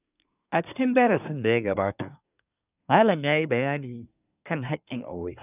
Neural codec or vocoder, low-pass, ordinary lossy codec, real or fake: codec, 24 kHz, 1 kbps, SNAC; 3.6 kHz; none; fake